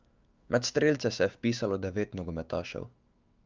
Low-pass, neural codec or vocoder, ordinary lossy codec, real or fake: 7.2 kHz; autoencoder, 48 kHz, 128 numbers a frame, DAC-VAE, trained on Japanese speech; Opus, 32 kbps; fake